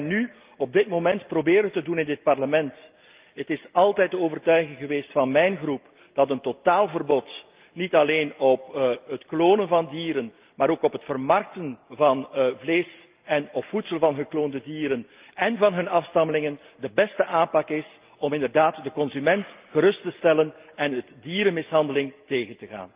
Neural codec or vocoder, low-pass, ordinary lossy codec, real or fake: none; 3.6 kHz; Opus, 24 kbps; real